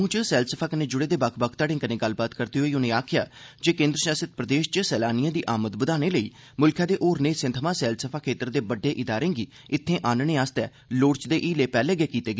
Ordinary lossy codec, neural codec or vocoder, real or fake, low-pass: none; none; real; none